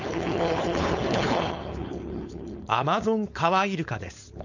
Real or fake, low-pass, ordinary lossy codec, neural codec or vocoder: fake; 7.2 kHz; none; codec, 16 kHz, 4.8 kbps, FACodec